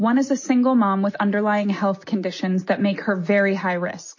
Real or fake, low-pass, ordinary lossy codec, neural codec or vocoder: real; 7.2 kHz; MP3, 32 kbps; none